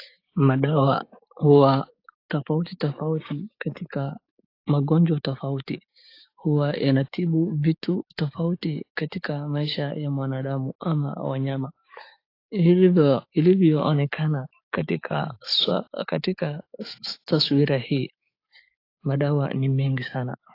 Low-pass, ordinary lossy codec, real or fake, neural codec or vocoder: 5.4 kHz; AAC, 32 kbps; fake; codec, 16 kHz, 6 kbps, DAC